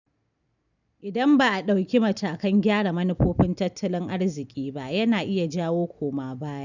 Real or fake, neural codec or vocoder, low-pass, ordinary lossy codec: real; none; 7.2 kHz; none